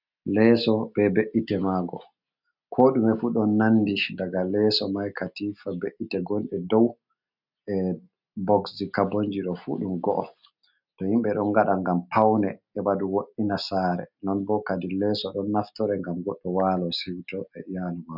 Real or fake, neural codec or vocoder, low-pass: real; none; 5.4 kHz